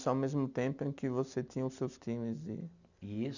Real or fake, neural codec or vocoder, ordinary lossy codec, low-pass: real; none; none; 7.2 kHz